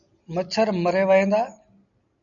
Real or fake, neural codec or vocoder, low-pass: real; none; 7.2 kHz